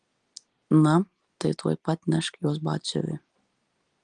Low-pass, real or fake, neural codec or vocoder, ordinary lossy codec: 9.9 kHz; real; none; Opus, 24 kbps